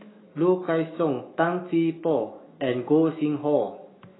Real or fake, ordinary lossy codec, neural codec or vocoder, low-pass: fake; AAC, 16 kbps; autoencoder, 48 kHz, 128 numbers a frame, DAC-VAE, trained on Japanese speech; 7.2 kHz